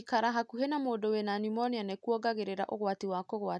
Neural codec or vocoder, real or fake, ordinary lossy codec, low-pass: none; real; none; none